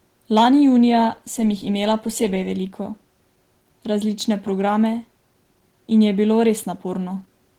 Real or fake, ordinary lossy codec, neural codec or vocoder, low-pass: real; Opus, 16 kbps; none; 19.8 kHz